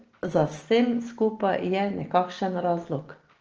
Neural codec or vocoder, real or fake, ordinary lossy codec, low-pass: none; real; Opus, 16 kbps; 7.2 kHz